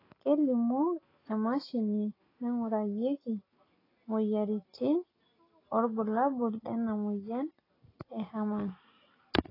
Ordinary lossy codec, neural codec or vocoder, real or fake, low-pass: AAC, 24 kbps; none; real; 5.4 kHz